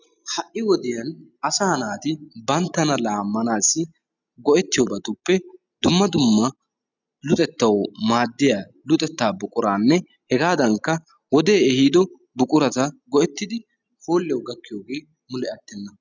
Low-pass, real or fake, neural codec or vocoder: 7.2 kHz; real; none